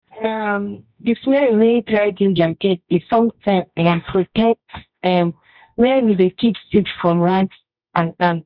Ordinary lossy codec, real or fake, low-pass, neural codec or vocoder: none; fake; 5.4 kHz; codec, 24 kHz, 0.9 kbps, WavTokenizer, medium music audio release